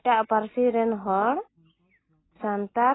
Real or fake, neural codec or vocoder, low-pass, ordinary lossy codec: real; none; 7.2 kHz; AAC, 16 kbps